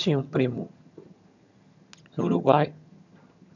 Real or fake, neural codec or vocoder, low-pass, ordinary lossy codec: fake; vocoder, 22.05 kHz, 80 mel bands, HiFi-GAN; 7.2 kHz; none